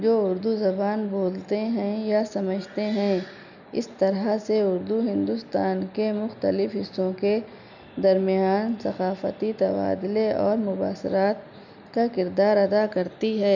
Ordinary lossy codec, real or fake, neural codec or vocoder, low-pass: none; real; none; 7.2 kHz